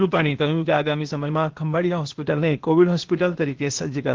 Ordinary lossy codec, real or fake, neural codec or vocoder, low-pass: Opus, 16 kbps; fake; codec, 16 kHz, 0.8 kbps, ZipCodec; 7.2 kHz